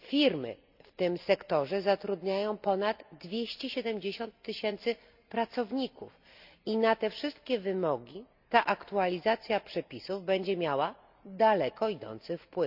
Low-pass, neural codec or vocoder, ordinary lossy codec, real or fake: 5.4 kHz; none; none; real